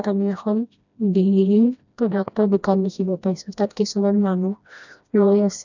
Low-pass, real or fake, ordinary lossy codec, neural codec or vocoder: 7.2 kHz; fake; none; codec, 16 kHz, 1 kbps, FreqCodec, smaller model